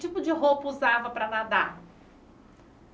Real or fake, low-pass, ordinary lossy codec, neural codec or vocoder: real; none; none; none